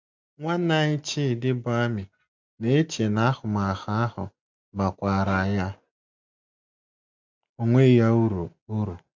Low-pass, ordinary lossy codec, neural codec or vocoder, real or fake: 7.2 kHz; MP3, 64 kbps; none; real